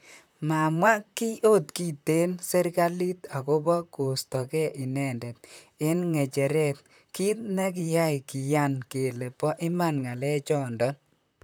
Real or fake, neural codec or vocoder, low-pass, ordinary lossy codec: fake; vocoder, 44.1 kHz, 128 mel bands, Pupu-Vocoder; none; none